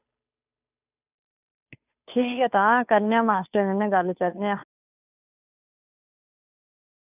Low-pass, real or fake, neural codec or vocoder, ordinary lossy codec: 3.6 kHz; fake; codec, 16 kHz, 2 kbps, FunCodec, trained on Chinese and English, 25 frames a second; none